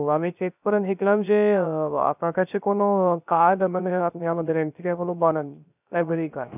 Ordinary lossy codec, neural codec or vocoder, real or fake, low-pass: none; codec, 16 kHz, 0.3 kbps, FocalCodec; fake; 3.6 kHz